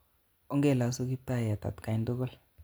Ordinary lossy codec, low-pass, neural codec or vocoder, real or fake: none; none; none; real